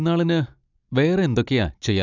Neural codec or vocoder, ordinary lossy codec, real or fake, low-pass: none; none; real; 7.2 kHz